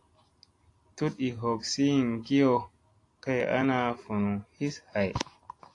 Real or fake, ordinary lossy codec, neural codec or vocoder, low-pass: real; AAC, 48 kbps; none; 10.8 kHz